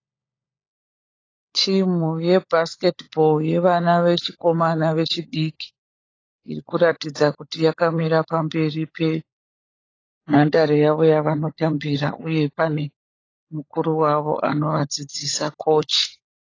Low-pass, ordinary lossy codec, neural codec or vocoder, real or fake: 7.2 kHz; AAC, 32 kbps; codec, 16 kHz, 16 kbps, FunCodec, trained on LibriTTS, 50 frames a second; fake